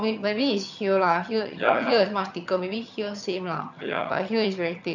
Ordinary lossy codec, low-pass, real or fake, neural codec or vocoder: none; 7.2 kHz; fake; vocoder, 22.05 kHz, 80 mel bands, HiFi-GAN